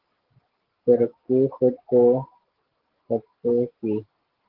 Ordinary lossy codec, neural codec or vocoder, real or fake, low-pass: Opus, 16 kbps; none; real; 5.4 kHz